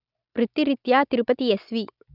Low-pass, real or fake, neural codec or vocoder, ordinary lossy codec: 5.4 kHz; real; none; none